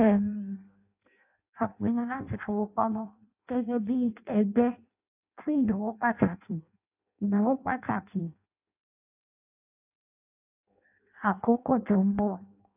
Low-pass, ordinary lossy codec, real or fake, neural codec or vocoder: 3.6 kHz; AAC, 32 kbps; fake; codec, 16 kHz in and 24 kHz out, 0.6 kbps, FireRedTTS-2 codec